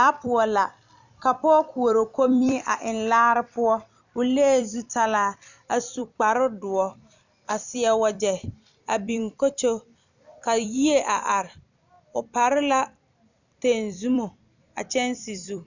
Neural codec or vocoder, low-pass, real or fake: vocoder, 44.1 kHz, 128 mel bands every 256 samples, BigVGAN v2; 7.2 kHz; fake